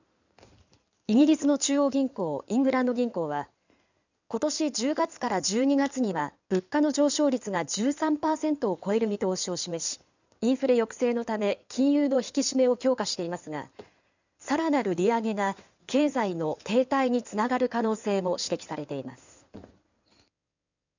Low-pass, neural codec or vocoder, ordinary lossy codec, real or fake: 7.2 kHz; codec, 16 kHz in and 24 kHz out, 2.2 kbps, FireRedTTS-2 codec; none; fake